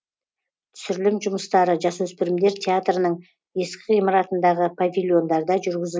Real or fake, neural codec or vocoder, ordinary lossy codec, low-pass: real; none; none; none